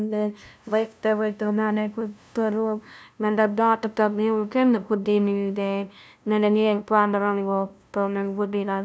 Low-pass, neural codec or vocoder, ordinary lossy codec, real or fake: none; codec, 16 kHz, 0.5 kbps, FunCodec, trained on LibriTTS, 25 frames a second; none; fake